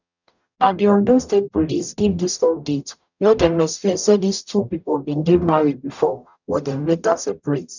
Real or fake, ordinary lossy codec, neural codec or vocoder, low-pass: fake; none; codec, 44.1 kHz, 0.9 kbps, DAC; 7.2 kHz